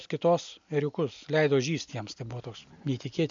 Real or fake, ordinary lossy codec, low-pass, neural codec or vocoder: real; MP3, 64 kbps; 7.2 kHz; none